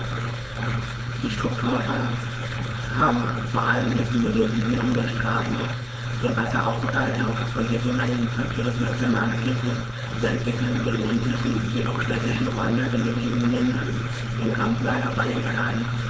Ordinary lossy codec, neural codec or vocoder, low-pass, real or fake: none; codec, 16 kHz, 4.8 kbps, FACodec; none; fake